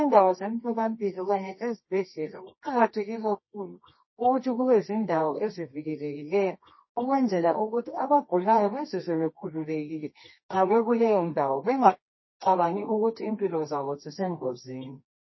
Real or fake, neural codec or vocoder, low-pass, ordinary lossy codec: fake; codec, 24 kHz, 0.9 kbps, WavTokenizer, medium music audio release; 7.2 kHz; MP3, 24 kbps